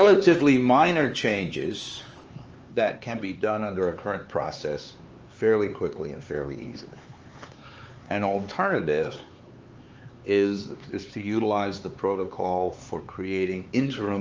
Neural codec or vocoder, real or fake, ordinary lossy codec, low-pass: codec, 16 kHz, 4 kbps, X-Codec, HuBERT features, trained on LibriSpeech; fake; Opus, 24 kbps; 7.2 kHz